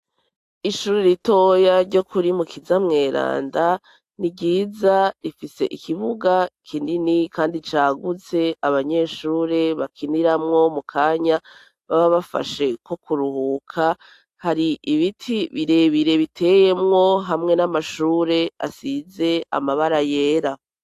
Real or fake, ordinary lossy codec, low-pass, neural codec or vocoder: real; AAC, 64 kbps; 14.4 kHz; none